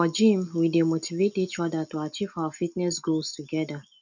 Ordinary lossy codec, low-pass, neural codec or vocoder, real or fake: none; 7.2 kHz; none; real